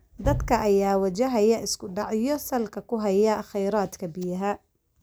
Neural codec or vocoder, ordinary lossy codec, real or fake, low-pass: none; none; real; none